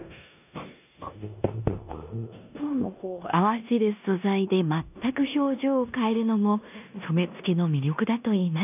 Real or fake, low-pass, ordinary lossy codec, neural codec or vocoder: fake; 3.6 kHz; none; codec, 16 kHz in and 24 kHz out, 0.9 kbps, LongCat-Audio-Codec, four codebook decoder